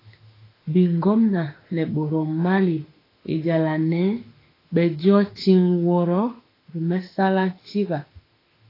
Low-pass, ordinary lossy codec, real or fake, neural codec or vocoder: 5.4 kHz; AAC, 24 kbps; fake; autoencoder, 48 kHz, 32 numbers a frame, DAC-VAE, trained on Japanese speech